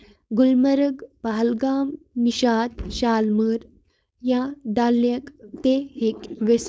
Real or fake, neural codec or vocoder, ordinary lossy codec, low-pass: fake; codec, 16 kHz, 4.8 kbps, FACodec; none; none